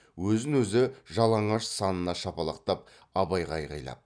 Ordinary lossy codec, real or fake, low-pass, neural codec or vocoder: none; real; 9.9 kHz; none